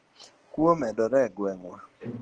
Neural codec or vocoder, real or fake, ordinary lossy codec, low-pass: none; real; Opus, 16 kbps; 9.9 kHz